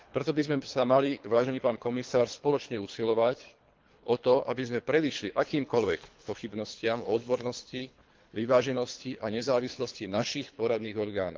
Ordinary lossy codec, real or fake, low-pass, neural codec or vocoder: Opus, 32 kbps; fake; 7.2 kHz; codec, 24 kHz, 3 kbps, HILCodec